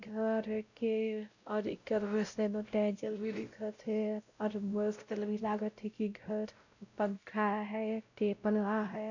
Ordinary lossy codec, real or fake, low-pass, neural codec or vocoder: none; fake; 7.2 kHz; codec, 16 kHz, 0.5 kbps, X-Codec, WavLM features, trained on Multilingual LibriSpeech